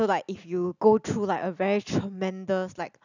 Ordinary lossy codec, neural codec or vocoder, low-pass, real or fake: none; none; 7.2 kHz; real